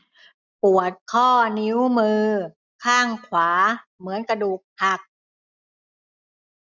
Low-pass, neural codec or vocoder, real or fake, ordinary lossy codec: 7.2 kHz; none; real; none